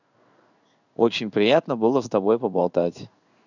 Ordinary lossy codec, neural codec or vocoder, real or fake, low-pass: none; codec, 16 kHz in and 24 kHz out, 1 kbps, XY-Tokenizer; fake; 7.2 kHz